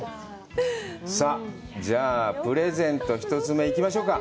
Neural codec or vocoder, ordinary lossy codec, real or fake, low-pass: none; none; real; none